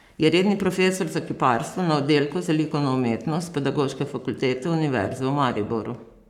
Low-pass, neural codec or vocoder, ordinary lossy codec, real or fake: 19.8 kHz; codec, 44.1 kHz, 7.8 kbps, Pupu-Codec; none; fake